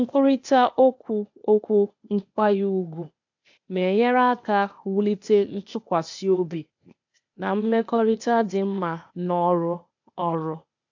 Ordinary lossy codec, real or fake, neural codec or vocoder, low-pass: AAC, 48 kbps; fake; codec, 16 kHz, 0.8 kbps, ZipCodec; 7.2 kHz